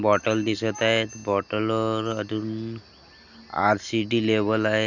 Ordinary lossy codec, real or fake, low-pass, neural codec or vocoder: none; real; 7.2 kHz; none